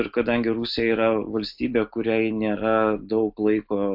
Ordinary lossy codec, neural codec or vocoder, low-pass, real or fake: AAC, 48 kbps; none; 5.4 kHz; real